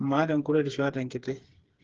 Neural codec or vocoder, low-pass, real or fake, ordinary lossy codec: codec, 16 kHz, 4 kbps, FreqCodec, smaller model; 7.2 kHz; fake; Opus, 16 kbps